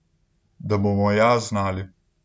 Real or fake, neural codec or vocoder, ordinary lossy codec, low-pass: real; none; none; none